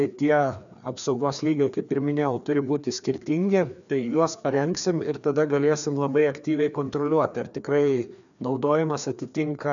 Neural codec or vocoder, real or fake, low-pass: codec, 16 kHz, 2 kbps, FreqCodec, larger model; fake; 7.2 kHz